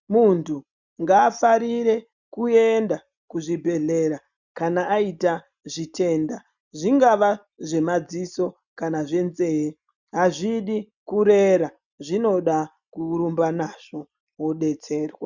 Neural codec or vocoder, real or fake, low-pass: none; real; 7.2 kHz